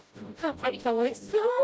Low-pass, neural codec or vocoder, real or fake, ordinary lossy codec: none; codec, 16 kHz, 0.5 kbps, FreqCodec, smaller model; fake; none